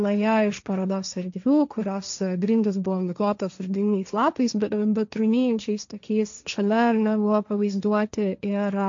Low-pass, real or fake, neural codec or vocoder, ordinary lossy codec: 7.2 kHz; fake; codec, 16 kHz, 1.1 kbps, Voila-Tokenizer; MP3, 64 kbps